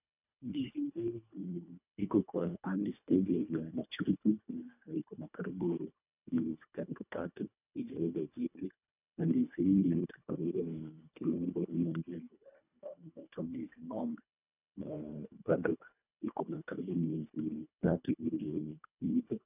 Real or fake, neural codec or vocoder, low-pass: fake; codec, 24 kHz, 1.5 kbps, HILCodec; 3.6 kHz